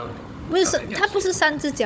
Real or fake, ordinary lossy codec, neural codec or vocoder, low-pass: fake; none; codec, 16 kHz, 16 kbps, FunCodec, trained on Chinese and English, 50 frames a second; none